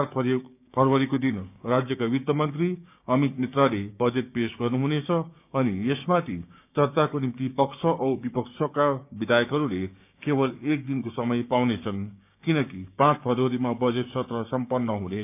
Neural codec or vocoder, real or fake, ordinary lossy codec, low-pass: codec, 16 kHz, 6 kbps, DAC; fake; none; 3.6 kHz